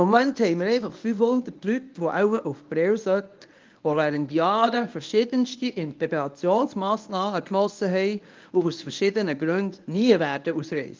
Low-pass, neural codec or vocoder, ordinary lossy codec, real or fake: 7.2 kHz; codec, 24 kHz, 0.9 kbps, WavTokenizer, medium speech release version 1; Opus, 32 kbps; fake